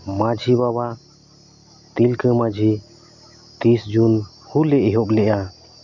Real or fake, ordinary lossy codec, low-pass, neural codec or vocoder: real; none; 7.2 kHz; none